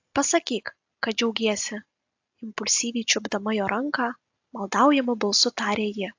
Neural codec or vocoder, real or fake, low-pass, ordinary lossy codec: none; real; 7.2 kHz; AAC, 48 kbps